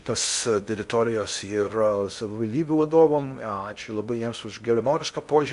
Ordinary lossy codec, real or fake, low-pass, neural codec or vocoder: MP3, 64 kbps; fake; 10.8 kHz; codec, 16 kHz in and 24 kHz out, 0.6 kbps, FocalCodec, streaming, 4096 codes